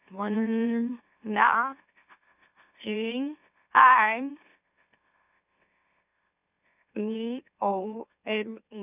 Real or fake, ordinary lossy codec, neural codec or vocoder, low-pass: fake; none; autoencoder, 44.1 kHz, a latent of 192 numbers a frame, MeloTTS; 3.6 kHz